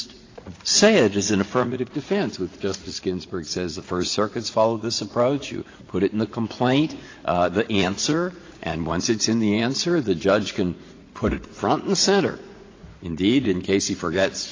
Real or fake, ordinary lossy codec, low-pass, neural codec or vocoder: fake; AAC, 32 kbps; 7.2 kHz; vocoder, 44.1 kHz, 80 mel bands, Vocos